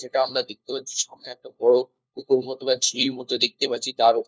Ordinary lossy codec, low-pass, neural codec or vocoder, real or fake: none; none; codec, 16 kHz, 1 kbps, FunCodec, trained on LibriTTS, 50 frames a second; fake